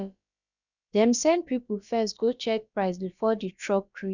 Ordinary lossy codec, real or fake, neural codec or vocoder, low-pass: none; fake; codec, 16 kHz, about 1 kbps, DyCAST, with the encoder's durations; 7.2 kHz